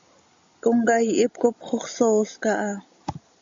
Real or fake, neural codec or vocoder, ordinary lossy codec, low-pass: real; none; AAC, 64 kbps; 7.2 kHz